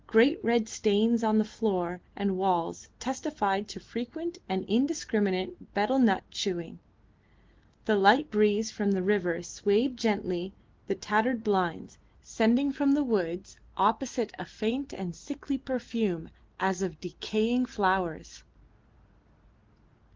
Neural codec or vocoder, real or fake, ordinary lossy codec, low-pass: none; real; Opus, 16 kbps; 7.2 kHz